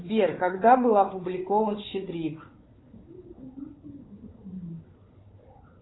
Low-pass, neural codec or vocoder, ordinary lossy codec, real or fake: 7.2 kHz; codec, 16 kHz, 4 kbps, FunCodec, trained on Chinese and English, 50 frames a second; AAC, 16 kbps; fake